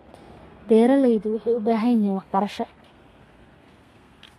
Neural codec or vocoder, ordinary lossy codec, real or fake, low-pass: codec, 32 kHz, 1.9 kbps, SNAC; MP3, 64 kbps; fake; 14.4 kHz